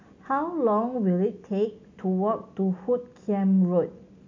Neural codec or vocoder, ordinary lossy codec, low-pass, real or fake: none; AAC, 48 kbps; 7.2 kHz; real